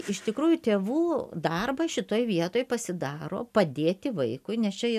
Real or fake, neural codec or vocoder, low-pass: real; none; 14.4 kHz